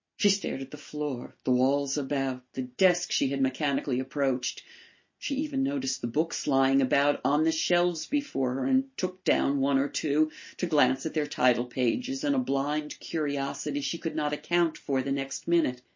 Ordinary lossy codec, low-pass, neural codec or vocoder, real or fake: MP3, 32 kbps; 7.2 kHz; none; real